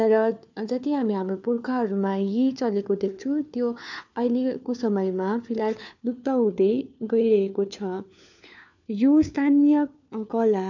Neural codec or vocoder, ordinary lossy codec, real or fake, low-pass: codec, 16 kHz, 4 kbps, FunCodec, trained on LibriTTS, 50 frames a second; none; fake; 7.2 kHz